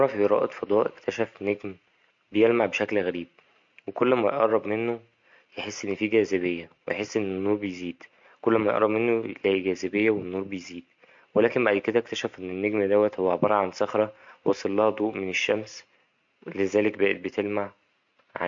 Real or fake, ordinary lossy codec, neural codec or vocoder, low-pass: real; MP3, 48 kbps; none; 7.2 kHz